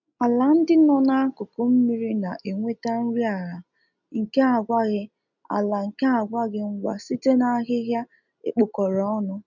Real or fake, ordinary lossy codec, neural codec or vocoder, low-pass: real; none; none; 7.2 kHz